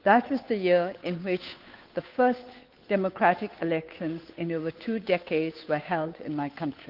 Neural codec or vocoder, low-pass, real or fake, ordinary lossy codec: codec, 16 kHz, 8 kbps, FunCodec, trained on Chinese and English, 25 frames a second; 5.4 kHz; fake; Opus, 32 kbps